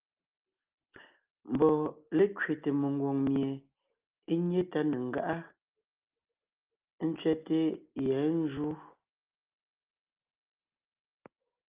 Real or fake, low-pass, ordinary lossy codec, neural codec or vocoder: real; 3.6 kHz; Opus, 32 kbps; none